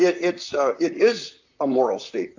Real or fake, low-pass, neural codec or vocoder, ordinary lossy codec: fake; 7.2 kHz; vocoder, 44.1 kHz, 128 mel bands, Pupu-Vocoder; AAC, 48 kbps